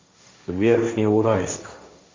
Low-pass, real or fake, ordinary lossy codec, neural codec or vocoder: 7.2 kHz; fake; MP3, 48 kbps; codec, 16 kHz, 1.1 kbps, Voila-Tokenizer